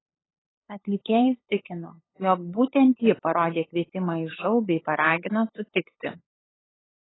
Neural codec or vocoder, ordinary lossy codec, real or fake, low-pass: codec, 16 kHz, 8 kbps, FunCodec, trained on LibriTTS, 25 frames a second; AAC, 16 kbps; fake; 7.2 kHz